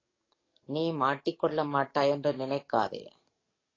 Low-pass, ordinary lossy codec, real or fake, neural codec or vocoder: 7.2 kHz; AAC, 32 kbps; fake; codec, 44.1 kHz, 7.8 kbps, DAC